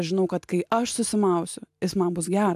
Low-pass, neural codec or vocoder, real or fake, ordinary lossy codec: 14.4 kHz; none; real; AAC, 96 kbps